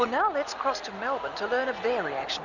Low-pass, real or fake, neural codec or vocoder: 7.2 kHz; real; none